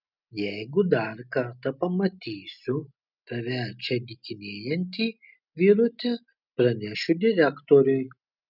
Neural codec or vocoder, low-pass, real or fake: none; 5.4 kHz; real